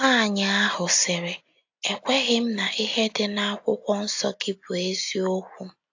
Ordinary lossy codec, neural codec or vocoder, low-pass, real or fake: AAC, 48 kbps; none; 7.2 kHz; real